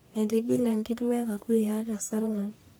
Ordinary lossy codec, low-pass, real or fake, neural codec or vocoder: none; none; fake; codec, 44.1 kHz, 1.7 kbps, Pupu-Codec